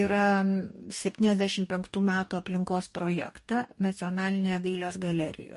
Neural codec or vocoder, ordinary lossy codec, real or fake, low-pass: codec, 44.1 kHz, 2.6 kbps, DAC; MP3, 48 kbps; fake; 14.4 kHz